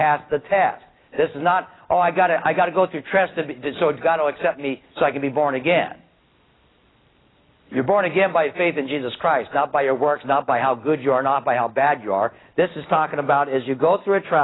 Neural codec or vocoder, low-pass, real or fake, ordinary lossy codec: none; 7.2 kHz; real; AAC, 16 kbps